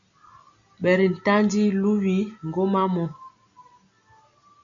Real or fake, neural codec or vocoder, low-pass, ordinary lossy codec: real; none; 7.2 kHz; AAC, 64 kbps